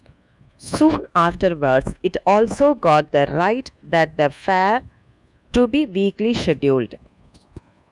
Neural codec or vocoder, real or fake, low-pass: codec, 24 kHz, 1.2 kbps, DualCodec; fake; 10.8 kHz